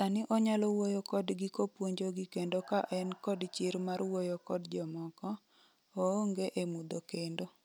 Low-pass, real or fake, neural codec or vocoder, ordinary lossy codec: none; real; none; none